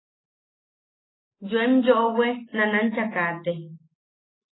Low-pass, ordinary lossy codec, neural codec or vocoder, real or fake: 7.2 kHz; AAC, 16 kbps; none; real